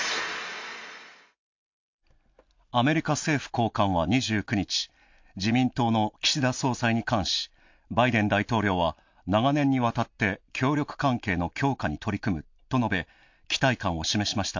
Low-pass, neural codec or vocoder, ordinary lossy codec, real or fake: 7.2 kHz; none; MP3, 64 kbps; real